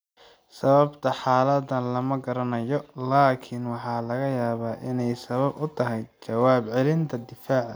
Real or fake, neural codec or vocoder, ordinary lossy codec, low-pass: real; none; none; none